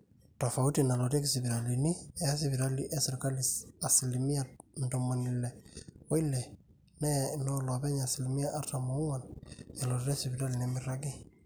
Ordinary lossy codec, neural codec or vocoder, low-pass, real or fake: none; none; none; real